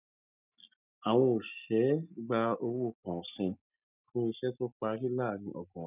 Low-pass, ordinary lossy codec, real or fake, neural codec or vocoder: 3.6 kHz; none; real; none